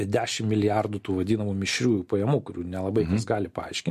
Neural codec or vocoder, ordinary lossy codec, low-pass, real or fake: none; MP3, 64 kbps; 14.4 kHz; real